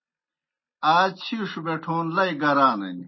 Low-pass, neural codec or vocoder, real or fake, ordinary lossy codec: 7.2 kHz; none; real; MP3, 24 kbps